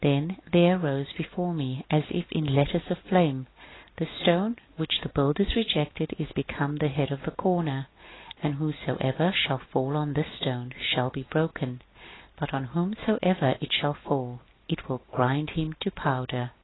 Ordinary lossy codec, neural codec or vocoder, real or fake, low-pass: AAC, 16 kbps; none; real; 7.2 kHz